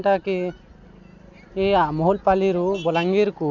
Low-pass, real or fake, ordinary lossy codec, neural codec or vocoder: 7.2 kHz; real; none; none